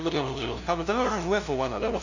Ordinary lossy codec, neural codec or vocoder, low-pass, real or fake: none; codec, 16 kHz, 0.5 kbps, FunCodec, trained on LibriTTS, 25 frames a second; 7.2 kHz; fake